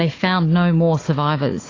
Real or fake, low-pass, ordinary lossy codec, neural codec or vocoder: fake; 7.2 kHz; AAC, 32 kbps; codec, 16 kHz, 4 kbps, FunCodec, trained on Chinese and English, 50 frames a second